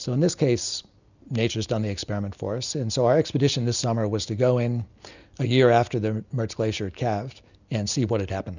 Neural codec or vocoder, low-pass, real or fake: none; 7.2 kHz; real